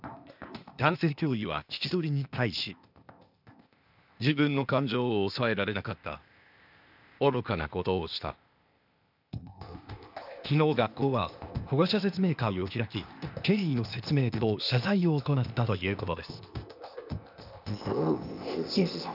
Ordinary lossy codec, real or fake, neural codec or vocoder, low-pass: none; fake; codec, 16 kHz, 0.8 kbps, ZipCodec; 5.4 kHz